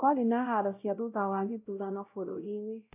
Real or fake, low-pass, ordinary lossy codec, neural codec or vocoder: fake; 3.6 kHz; AAC, 32 kbps; codec, 16 kHz, 0.5 kbps, X-Codec, WavLM features, trained on Multilingual LibriSpeech